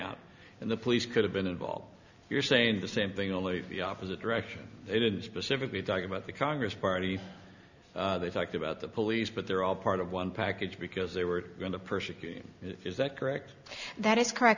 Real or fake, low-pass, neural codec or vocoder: real; 7.2 kHz; none